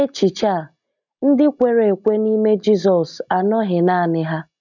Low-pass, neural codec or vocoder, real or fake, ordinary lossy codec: 7.2 kHz; none; real; none